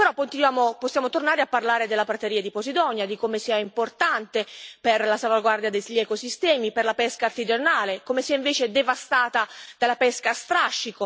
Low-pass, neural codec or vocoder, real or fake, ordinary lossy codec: none; none; real; none